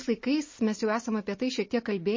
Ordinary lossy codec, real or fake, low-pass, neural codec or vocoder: MP3, 32 kbps; real; 7.2 kHz; none